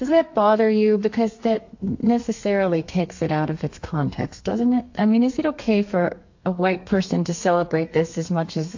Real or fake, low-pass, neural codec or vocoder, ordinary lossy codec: fake; 7.2 kHz; codec, 32 kHz, 1.9 kbps, SNAC; AAC, 48 kbps